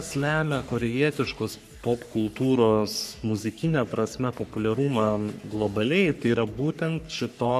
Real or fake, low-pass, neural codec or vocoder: fake; 14.4 kHz; codec, 44.1 kHz, 3.4 kbps, Pupu-Codec